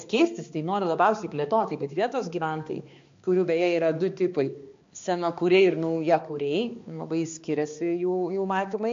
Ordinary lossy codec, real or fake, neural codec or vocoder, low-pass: MP3, 48 kbps; fake; codec, 16 kHz, 2 kbps, X-Codec, HuBERT features, trained on balanced general audio; 7.2 kHz